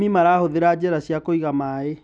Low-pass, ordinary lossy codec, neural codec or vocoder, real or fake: 9.9 kHz; none; none; real